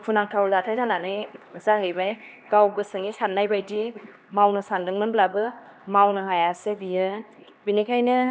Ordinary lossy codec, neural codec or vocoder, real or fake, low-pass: none; codec, 16 kHz, 2 kbps, X-Codec, HuBERT features, trained on LibriSpeech; fake; none